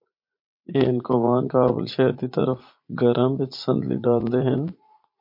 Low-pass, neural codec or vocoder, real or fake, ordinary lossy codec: 5.4 kHz; vocoder, 44.1 kHz, 80 mel bands, Vocos; fake; MP3, 32 kbps